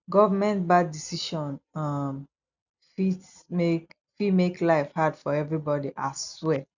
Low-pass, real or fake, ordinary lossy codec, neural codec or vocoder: 7.2 kHz; real; none; none